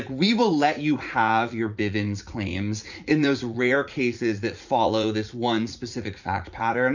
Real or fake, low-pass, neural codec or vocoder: fake; 7.2 kHz; vocoder, 44.1 kHz, 80 mel bands, Vocos